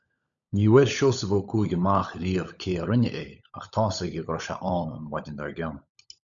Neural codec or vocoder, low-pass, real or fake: codec, 16 kHz, 16 kbps, FunCodec, trained on LibriTTS, 50 frames a second; 7.2 kHz; fake